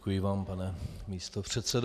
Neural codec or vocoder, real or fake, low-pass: none; real; 14.4 kHz